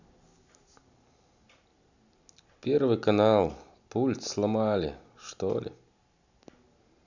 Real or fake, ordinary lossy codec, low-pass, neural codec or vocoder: real; none; 7.2 kHz; none